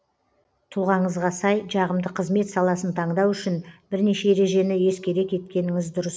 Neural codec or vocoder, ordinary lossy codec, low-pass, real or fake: none; none; none; real